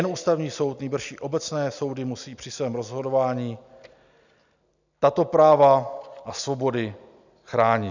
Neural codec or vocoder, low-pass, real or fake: none; 7.2 kHz; real